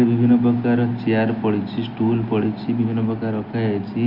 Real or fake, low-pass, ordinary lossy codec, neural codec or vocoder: real; 5.4 kHz; Opus, 32 kbps; none